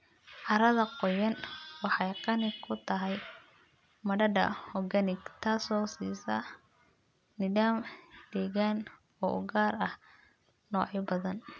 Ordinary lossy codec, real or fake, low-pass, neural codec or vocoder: none; real; none; none